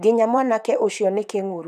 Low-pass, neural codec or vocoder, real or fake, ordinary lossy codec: 14.4 kHz; vocoder, 44.1 kHz, 128 mel bands, Pupu-Vocoder; fake; none